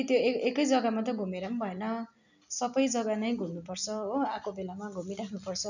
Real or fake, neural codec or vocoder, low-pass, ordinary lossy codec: real; none; 7.2 kHz; none